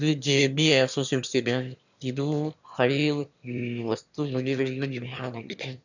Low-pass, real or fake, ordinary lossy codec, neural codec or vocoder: 7.2 kHz; fake; none; autoencoder, 22.05 kHz, a latent of 192 numbers a frame, VITS, trained on one speaker